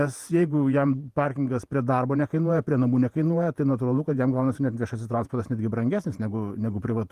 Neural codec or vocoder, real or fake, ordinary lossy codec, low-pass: vocoder, 48 kHz, 128 mel bands, Vocos; fake; Opus, 24 kbps; 14.4 kHz